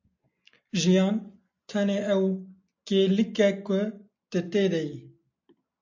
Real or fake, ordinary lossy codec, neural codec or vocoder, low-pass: real; MP3, 64 kbps; none; 7.2 kHz